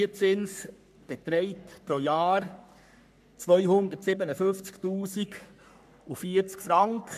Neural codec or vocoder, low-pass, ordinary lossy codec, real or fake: codec, 44.1 kHz, 3.4 kbps, Pupu-Codec; 14.4 kHz; none; fake